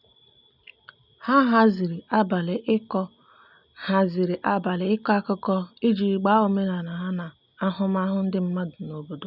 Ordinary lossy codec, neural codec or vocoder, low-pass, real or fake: none; none; 5.4 kHz; real